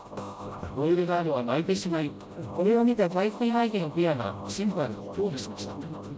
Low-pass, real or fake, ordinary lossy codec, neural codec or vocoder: none; fake; none; codec, 16 kHz, 0.5 kbps, FreqCodec, smaller model